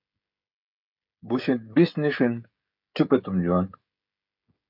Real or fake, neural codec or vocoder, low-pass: fake; codec, 16 kHz, 16 kbps, FreqCodec, smaller model; 5.4 kHz